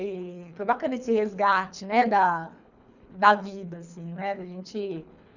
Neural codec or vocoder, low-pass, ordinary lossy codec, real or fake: codec, 24 kHz, 3 kbps, HILCodec; 7.2 kHz; none; fake